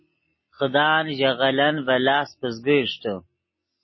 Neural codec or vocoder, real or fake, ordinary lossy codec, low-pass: none; real; MP3, 24 kbps; 7.2 kHz